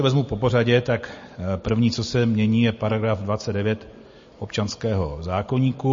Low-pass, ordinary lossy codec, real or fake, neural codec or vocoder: 7.2 kHz; MP3, 32 kbps; real; none